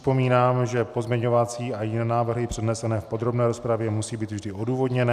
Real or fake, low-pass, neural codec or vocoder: real; 14.4 kHz; none